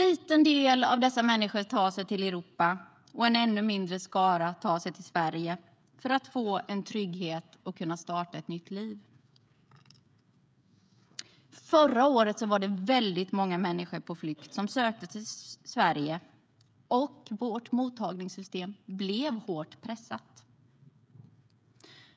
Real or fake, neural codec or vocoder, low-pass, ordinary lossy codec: fake; codec, 16 kHz, 16 kbps, FreqCodec, smaller model; none; none